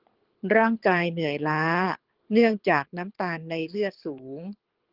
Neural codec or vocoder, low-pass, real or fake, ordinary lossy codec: codec, 24 kHz, 6 kbps, HILCodec; 5.4 kHz; fake; Opus, 16 kbps